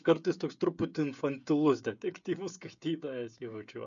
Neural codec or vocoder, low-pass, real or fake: codec, 16 kHz, 16 kbps, FreqCodec, smaller model; 7.2 kHz; fake